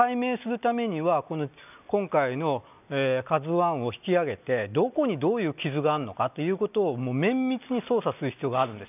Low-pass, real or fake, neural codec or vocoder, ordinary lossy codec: 3.6 kHz; real; none; none